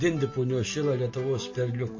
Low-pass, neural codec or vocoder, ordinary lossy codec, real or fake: 7.2 kHz; none; MP3, 32 kbps; real